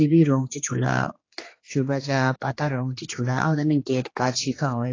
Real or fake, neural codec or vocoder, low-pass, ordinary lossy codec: fake; codec, 16 kHz, 2 kbps, X-Codec, HuBERT features, trained on general audio; 7.2 kHz; AAC, 32 kbps